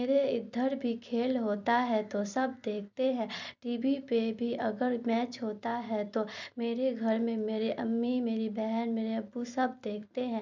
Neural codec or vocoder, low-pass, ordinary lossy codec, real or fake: vocoder, 44.1 kHz, 128 mel bands every 256 samples, BigVGAN v2; 7.2 kHz; none; fake